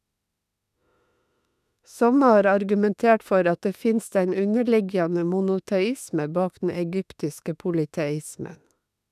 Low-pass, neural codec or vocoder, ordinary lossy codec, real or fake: 14.4 kHz; autoencoder, 48 kHz, 32 numbers a frame, DAC-VAE, trained on Japanese speech; none; fake